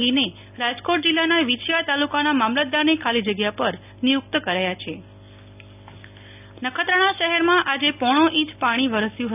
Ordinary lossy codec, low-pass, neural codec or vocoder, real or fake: none; 3.6 kHz; none; real